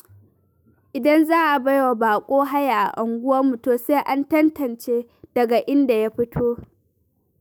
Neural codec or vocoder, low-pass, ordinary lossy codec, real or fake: autoencoder, 48 kHz, 128 numbers a frame, DAC-VAE, trained on Japanese speech; none; none; fake